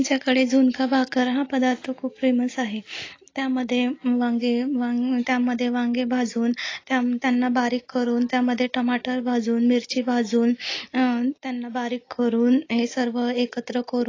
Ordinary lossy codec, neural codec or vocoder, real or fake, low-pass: AAC, 32 kbps; none; real; 7.2 kHz